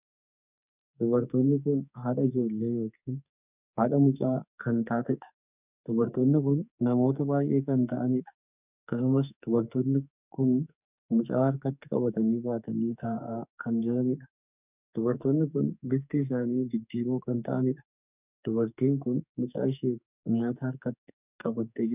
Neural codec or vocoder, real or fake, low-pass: codec, 44.1 kHz, 2.6 kbps, SNAC; fake; 3.6 kHz